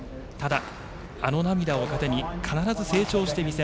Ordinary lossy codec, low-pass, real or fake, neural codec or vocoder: none; none; real; none